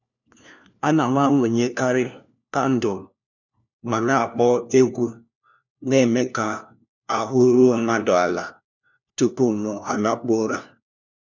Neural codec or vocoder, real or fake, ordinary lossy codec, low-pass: codec, 16 kHz, 1 kbps, FunCodec, trained on LibriTTS, 50 frames a second; fake; none; 7.2 kHz